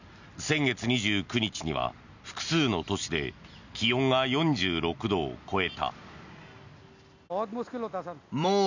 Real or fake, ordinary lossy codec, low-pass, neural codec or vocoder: real; none; 7.2 kHz; none